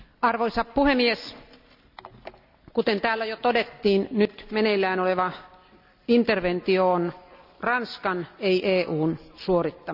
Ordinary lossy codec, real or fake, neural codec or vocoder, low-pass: none; real; none; 5.4 kHz